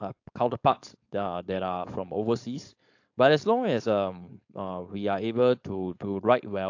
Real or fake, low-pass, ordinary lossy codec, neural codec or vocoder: fake; 7.2 kHz; AAC, 48 kbps; codec, 16 kHz, 4.8 kbps, FACodec